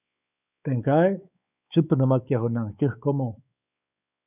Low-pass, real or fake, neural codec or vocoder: 3.6 kHz; fake; codec, 16 kHz, 4 kbps, X-Codec, WavLM features, trained on Multilingual LibriSpeech